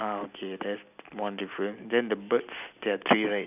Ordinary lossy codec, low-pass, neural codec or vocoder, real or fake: none; 3.6 kHz; none; real